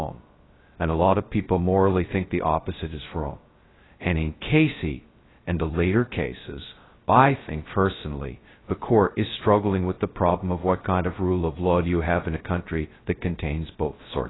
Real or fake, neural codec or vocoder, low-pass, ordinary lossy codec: fake; codec, 16 kHz, 0.2 kbps, FocalCodec; 7.2 kHz; AAC, 16 kbps